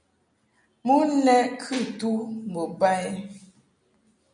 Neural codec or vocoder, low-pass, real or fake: none; 9.9 kHz; real